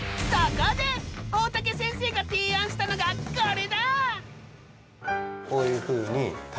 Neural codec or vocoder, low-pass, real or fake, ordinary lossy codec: none; none; real; none